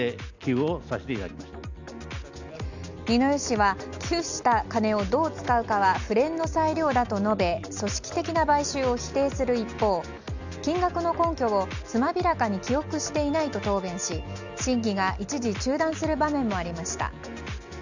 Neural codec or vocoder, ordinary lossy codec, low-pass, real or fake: none; none; 7.2 kHz; real